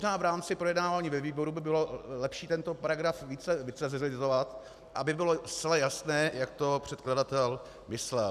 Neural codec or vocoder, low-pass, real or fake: none; 14.4 kHz; real